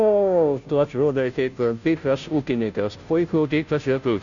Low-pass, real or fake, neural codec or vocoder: 7.2 kHz; fake; codec, 16 kHz, 0.5 kbps, FunCodec, trained on Chinese and English, 25 frames a second